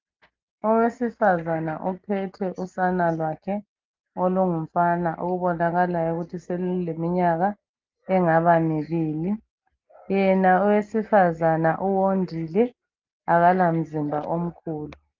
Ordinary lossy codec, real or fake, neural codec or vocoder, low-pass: Opus, 24 kbps; real; none; 7.2 kHz